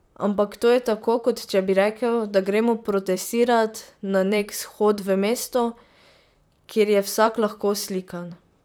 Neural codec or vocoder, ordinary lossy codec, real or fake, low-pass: vocoder, 44.1 kHz, 128 mel bands, Pupu-Vocoder; none; fake; none